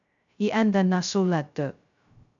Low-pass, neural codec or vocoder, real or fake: 7.2 kHz; codec, 16 kHz, 0.2 kbps, FocalCodec; fake